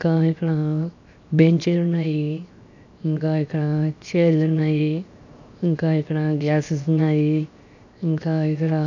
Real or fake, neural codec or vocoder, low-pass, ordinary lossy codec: fake; codec, 16 kHz, 0.7 kbps, FocalCodec; 7.2 kHz; none